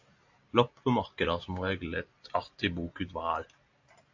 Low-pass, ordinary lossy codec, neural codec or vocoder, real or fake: 7.2 kHz; AAC, 48 kbps; none; real